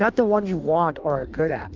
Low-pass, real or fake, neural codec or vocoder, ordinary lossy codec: 7.2 kHz; fake; codec, 16 kHz in and 24 kHz out, 1.1 kbps, FireRedTTS-2 codec; Opus, 24 kbps